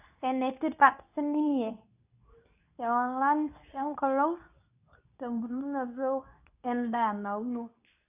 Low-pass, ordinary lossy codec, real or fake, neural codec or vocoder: 3.6 kHz; none; fake; codec, 24 kHz, 0.9 kbps, WavTokenizer, medium speech release version 2